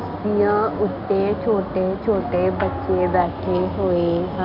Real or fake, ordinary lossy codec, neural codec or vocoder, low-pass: real; none; none; 5.4 kHz